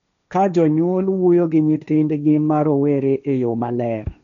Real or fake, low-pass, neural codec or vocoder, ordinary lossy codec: fake; 7.2 kHz; codec, 16 kHz, 1.1 kbps, Voila-Tokenizer; none